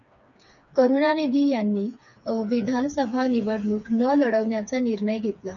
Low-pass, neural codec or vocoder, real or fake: 7.2 kHz; codec, 16 kHz, 4 kbps, FreqCodec, smaller model; fake